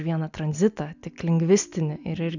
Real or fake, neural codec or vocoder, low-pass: real; none; 7.2 kHz